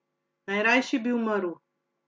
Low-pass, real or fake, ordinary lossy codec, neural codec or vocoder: none; real; none; none